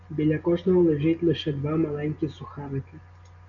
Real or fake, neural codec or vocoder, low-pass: real; none; 7.2 kHz